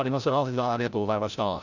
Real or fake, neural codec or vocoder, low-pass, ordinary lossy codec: fake; codec, 16 kHz, 0.5 kbps, FreqCodec, larger model; 7.2 kHz; none